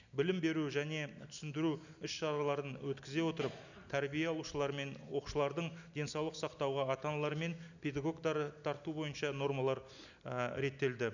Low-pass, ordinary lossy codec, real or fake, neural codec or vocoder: 7.2 kHz; none; real; none